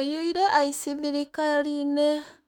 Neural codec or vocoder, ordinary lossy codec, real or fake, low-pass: autoencoder, 48 kHz, 32 numbers a frame, DAC-VAE, trained on Japanese speech; none; fake; 19.8 kHz